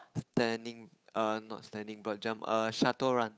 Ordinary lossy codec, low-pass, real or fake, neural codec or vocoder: none; none; fake; codec, 16 kHz, 8 kbps, FunCodec, trained on Chinese and English, 25 frames a second